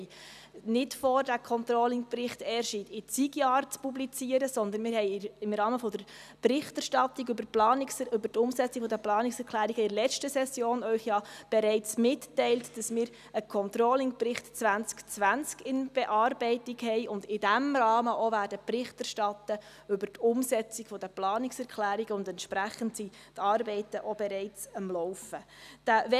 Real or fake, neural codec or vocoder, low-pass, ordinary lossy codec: real; none; 14.4 kHz; none